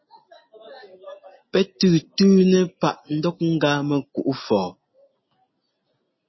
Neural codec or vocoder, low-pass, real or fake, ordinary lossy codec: none; 7.2 kHz; real; MP3, 24 kbps